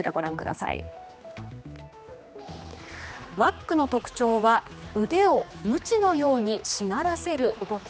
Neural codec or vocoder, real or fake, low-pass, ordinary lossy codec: codec, 16 kHz, 2 kbps, X-Codec, HuBERT features, trained on general audio; fake; none; none